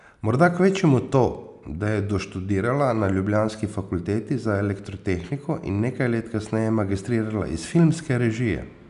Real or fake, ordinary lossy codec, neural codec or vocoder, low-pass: real; none; none; 10.8 kHz